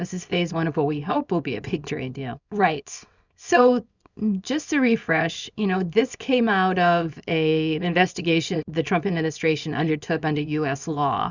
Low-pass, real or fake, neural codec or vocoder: 7.2 kHz; fake; codec, 24 kHz, 0.9 kbps, WavTokenizer, small release